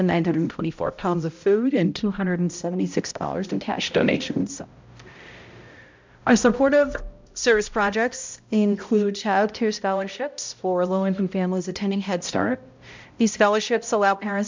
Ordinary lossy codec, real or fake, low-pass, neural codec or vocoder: MP3, 64 kbps; fake; 7.2 kHz; codec, 16 kHz, 0.5 kbps, X-Codec, HuBERT features, trained on balanced general audio